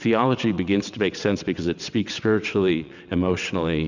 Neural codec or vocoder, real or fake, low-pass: vocoder, 22.05 kHz, 80 mel bands, WaveNeXt; fake; 7.2 kHz